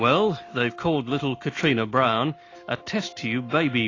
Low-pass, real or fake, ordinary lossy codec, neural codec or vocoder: 7.2 kHz; real; AAC, 32 kbps; none